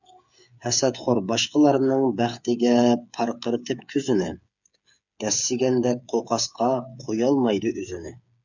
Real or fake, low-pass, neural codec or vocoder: fake; 7.2 kHz; codec, 16 kHz, 8 kbps, FreqCodec, smaller model